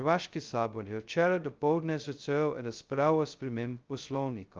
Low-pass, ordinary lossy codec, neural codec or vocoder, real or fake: 7.2 kHz; Opus, 24 kbps; codec, 16 kHz, 0.2 kbps, FocalCodec; fake